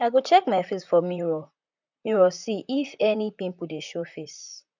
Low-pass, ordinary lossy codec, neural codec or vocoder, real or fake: 7.2 kHz; none; vocoder, 44.1 kHz, 128 mel bands, Pupu-Vocoder; fake